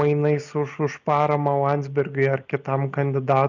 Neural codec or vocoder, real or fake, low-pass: none; real; 7.2 kHz